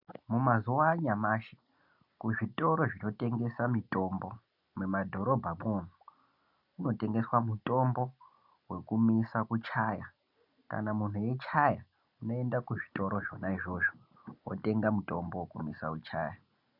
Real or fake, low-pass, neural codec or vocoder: real; 5.4 kHz; none